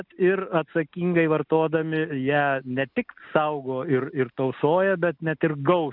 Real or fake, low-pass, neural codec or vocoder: real; 5.4 kHz; none